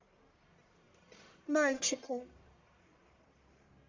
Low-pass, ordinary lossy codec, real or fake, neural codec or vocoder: 7.2 kHz; MP3, 48 kbps; fake; codec, 44.1 kHz, 1.7 kbps, Pupu-Codec